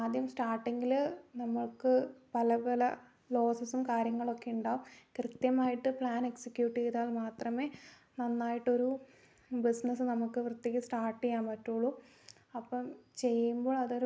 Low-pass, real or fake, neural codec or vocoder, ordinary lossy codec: none; real; none; none